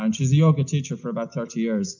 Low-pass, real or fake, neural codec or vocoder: 7.2 kHz; real; none